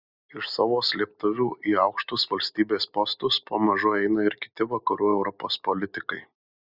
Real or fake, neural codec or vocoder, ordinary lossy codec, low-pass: real; none; Opus, 64 kbps; 5.4 kHz